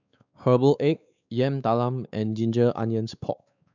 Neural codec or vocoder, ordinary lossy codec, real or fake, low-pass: codec, 16 kHz, 4 kbps, X-Codec, WavLM features, trained on Multilingual LibriSpeech; none; fake; 7.2 kHz